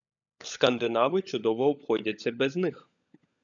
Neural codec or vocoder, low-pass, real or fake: codec, 16 kHz, 16 kbps, FunCodec, trained on LibriTTS, 50 frames a second; 7.2 kHz; fake